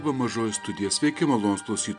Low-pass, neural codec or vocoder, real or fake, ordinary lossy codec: 9.9 kHz; none; real; MP3, 96 kbps